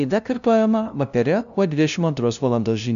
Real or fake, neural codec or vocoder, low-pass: fake; codec, 16 kHz, 0.5 kbps, FunCodec, trained on LibriTTS, 25 frames a second; 7.2 kHz